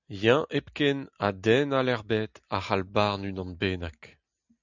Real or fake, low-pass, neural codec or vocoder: real; 7.2 kHz; none